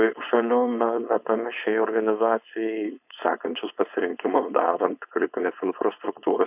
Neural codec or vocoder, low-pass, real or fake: codec, 16 kHz, 4.8 kbps, FACodec; 3.6 kHz; fake